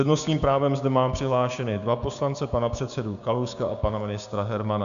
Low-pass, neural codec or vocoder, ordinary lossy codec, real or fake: 7.2 kHz; codec, 16 kHz, 6 kbps, DAC; MP3, 96 kbps; fake